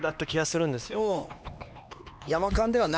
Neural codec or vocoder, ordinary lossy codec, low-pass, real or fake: codec, 16 kHz, 2 kbps, X-Codec, HuBERT features, trained on LibriSpeech; none; none; fake